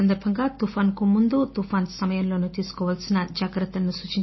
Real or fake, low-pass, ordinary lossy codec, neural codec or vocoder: real; 7.2 kHz; MP3, 24 kbps; none